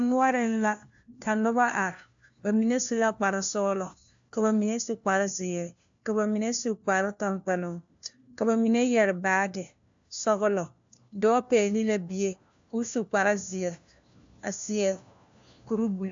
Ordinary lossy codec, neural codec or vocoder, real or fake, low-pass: AAC, 64 kbps; codec, 16 kHz, 1 kbps, FunCodec, trained on LibriTTS, 50 frames a second; fake; 7.2 kHz